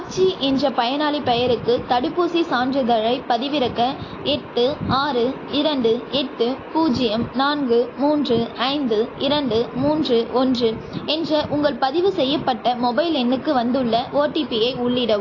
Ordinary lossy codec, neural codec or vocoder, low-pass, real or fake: AAC, 32 kbps; none; 7.2 kHz; real